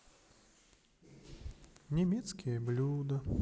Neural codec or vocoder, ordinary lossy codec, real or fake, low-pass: none; none; real; none